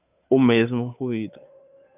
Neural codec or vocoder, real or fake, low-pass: codec, 16 kHz, 8 kbps, FunCodec, trained on Chinese and English, 25 frames a second; fake; 3.6 kHz